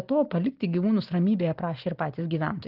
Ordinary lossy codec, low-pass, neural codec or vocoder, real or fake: Opus, 16 kbps; 5.4 kHz; none; real